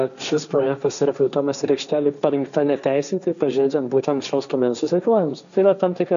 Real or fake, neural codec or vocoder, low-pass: fake; codec, 16 kHz, 1.1 kbps, Voila-Tokenizer; 7.2 kHz